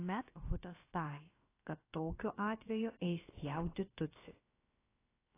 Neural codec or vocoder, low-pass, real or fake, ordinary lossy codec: codec, 16 kHz, about 1 kbps, DyCAST, with the encoder's durations; 3.6 kHz; fake; AAC, 16 kbps